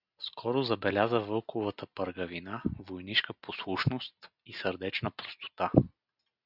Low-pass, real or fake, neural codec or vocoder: 5.4 kHz; real; none